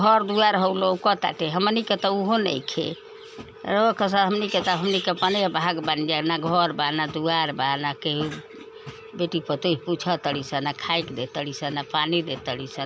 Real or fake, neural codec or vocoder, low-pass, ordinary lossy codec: real; none; none; none